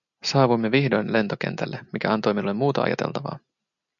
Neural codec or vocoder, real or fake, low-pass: none; real; 7.2 kHz